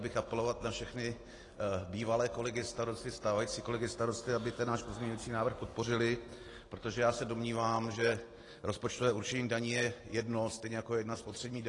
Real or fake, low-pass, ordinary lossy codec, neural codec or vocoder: real; 10.8 kHz; AAC, 32 kbps; none